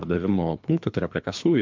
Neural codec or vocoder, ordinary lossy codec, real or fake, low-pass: codec, 24 kHz, 3 kbps, HILCodec; MP3, 64 kbps; fake; 7.2 kHz